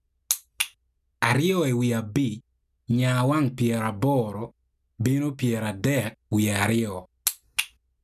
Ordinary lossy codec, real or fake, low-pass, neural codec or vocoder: none; real; 14.4 kHz; none